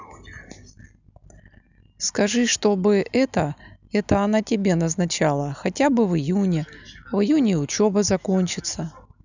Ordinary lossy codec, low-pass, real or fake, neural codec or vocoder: none; 7.2 kHz; real; none